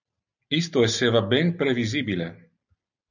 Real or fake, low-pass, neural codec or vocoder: real; 7.2 kHz; none